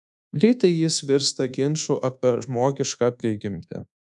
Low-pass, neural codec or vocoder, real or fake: 10.8 kHz; codec, 24 kHz, 1.2 kbps, DualCodec; fake